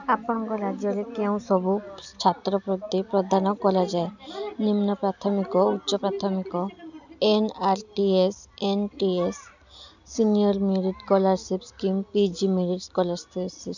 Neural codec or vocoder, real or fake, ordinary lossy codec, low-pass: none; real; none; 7.2 kHz